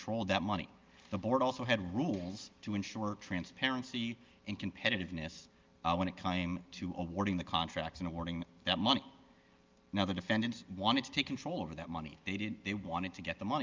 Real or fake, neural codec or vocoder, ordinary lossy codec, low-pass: real; none; Opus, 32 kbps; 7.2 kHz